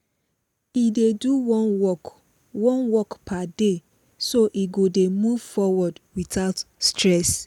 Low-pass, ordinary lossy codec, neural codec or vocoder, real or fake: 19.8 kHz; none; none; real